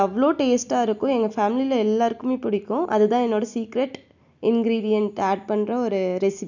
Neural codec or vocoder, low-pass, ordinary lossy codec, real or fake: none; 7.2 kHz; none; real